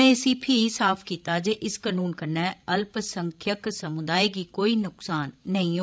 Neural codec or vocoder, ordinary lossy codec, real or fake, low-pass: codec, 16 kHz, 16 kbps, FreqCodec, larger model; none; fake; none